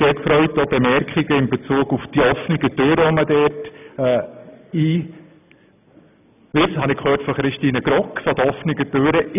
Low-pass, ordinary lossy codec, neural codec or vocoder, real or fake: 3.6 kHz; none; none; real